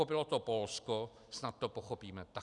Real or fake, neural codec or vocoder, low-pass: real; none; 10.8 kHz